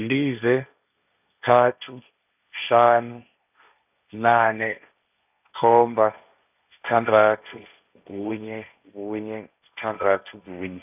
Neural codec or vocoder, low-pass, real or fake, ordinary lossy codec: codec, 16 kHz, 1.1 kbps, Voila-Tokenizer; 3.6 kHz; fake; none